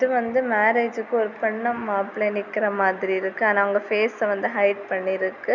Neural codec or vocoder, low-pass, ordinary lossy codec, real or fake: none; 7.2 kHz; none; real